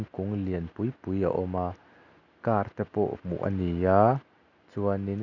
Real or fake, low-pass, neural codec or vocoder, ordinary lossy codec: real; 7.2 kHz; none; none